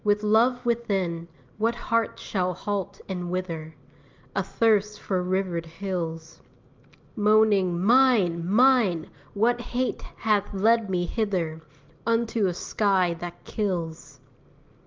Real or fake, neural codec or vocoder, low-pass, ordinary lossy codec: real; none; 7.2 kHz; Opus, 32 kbps